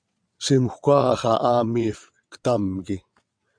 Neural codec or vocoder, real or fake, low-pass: vocoder, 22.05 kHz, 80 mel bands, WaveNeXt; fake; 9.9 kHz